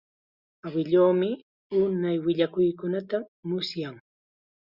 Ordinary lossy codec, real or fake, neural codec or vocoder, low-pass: Opus, 64 kbps; real; none; 5.4 kHz